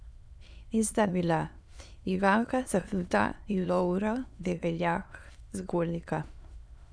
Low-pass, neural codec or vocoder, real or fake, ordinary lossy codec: none; autoencoder, 22.05 kHz, a latent of 192 numbers a frame, VITS, trained on many speakers; fake; none